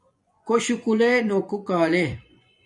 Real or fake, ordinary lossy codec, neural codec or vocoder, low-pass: fake; MP3, 64 kbps; vocoder, 24 kHz, 100 mel bands, Vocos; 10.8 kHz